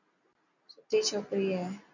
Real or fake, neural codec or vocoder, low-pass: real; none; 7.2 kHz